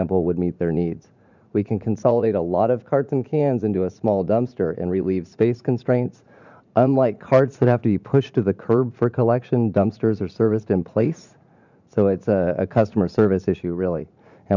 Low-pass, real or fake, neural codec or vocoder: 7.2 kHz; fake; vocoder, 44.1 kHz, 80 mel bands, Vocos